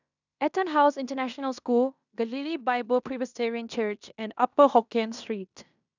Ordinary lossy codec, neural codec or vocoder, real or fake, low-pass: none; codec, 16 kHz in and 24 kHz out, 0.9 kbps, LongCat-Audio-Codec, fine tuned four codebook decoder; fake; 7.2 kHz